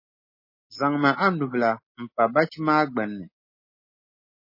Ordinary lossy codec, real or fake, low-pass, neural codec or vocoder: MP3, 24 kbps; real; 5.4 kHz; none